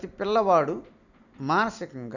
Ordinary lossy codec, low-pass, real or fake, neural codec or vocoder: none; 7.2 kHz; real; none